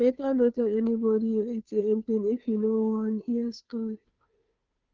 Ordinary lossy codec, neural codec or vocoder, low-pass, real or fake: Opus, 32 kbps; codec, 16 kHz, 2 kbps, FunCodec, trained on Chinese and English, 25 frames a second; 7.2 kHz; fake